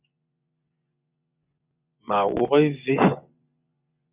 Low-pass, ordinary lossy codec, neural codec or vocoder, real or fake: 3.6 kHz; Opus, 64 kbps; vocoder, 24 kHz, 100 mel bands, Vocos; fake